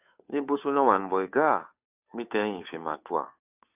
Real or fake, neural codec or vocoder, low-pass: fake; codec, 16 kHz, 2 kbps, FunCodec, trained on Chinese and English, 25 frames a second; 3.6 kHz